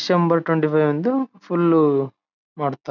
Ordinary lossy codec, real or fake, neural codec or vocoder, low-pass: none; real; none; 7.2 kHz